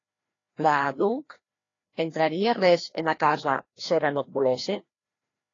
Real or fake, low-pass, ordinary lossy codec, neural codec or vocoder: fake; 7.2 kHz; AAC, 32 kbps; codec, 16 kHz, 1 kbps, FreqCodec, larger model